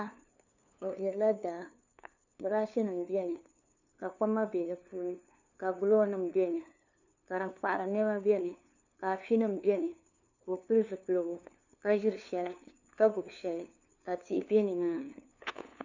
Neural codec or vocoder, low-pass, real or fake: codec, 16 kHz, 2 kbps, FunCodec, trained on LibriTTS, 25 frames a second; 7.2 kHz; fake